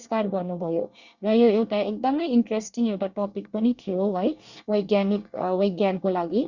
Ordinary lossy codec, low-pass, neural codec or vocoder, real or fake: Opus, 64 kbps; 7.2 kHz; codec, 24 kHz, 1 kbps, SNAC; fake